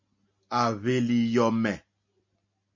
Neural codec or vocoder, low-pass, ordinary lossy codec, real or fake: none; 7.2 kHz; MP3, 48 kbps; real